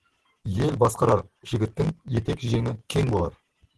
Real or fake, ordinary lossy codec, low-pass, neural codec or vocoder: real; Opus, 16 kbps; 10.8 kHz; none